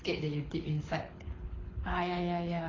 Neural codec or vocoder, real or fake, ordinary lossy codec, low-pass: codec, 24 kHz, 6 kbps, HILCodec; fake; AAC, 32 kbps; 7.2 kHz